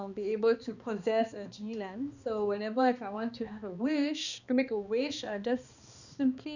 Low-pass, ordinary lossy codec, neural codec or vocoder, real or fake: 7.2 kHz; none; codec, 16 kHz, 2 kbps, X-Codec, HuBERT features, trained on balanced general audio; fake